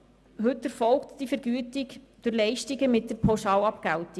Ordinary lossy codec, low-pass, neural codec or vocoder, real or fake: none; none; none; real